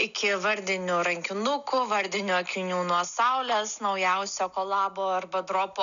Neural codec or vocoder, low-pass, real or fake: none; 7.2 kHz; real